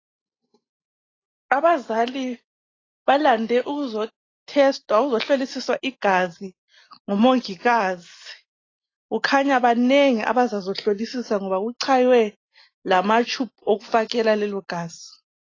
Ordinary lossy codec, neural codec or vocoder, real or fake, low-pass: AAC, 32 kbps; none; real; 7.2 kHz